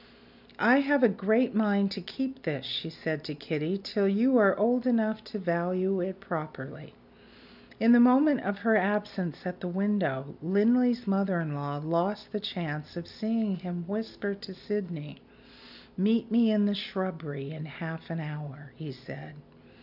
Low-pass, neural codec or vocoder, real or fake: 5.4 kHz; none; real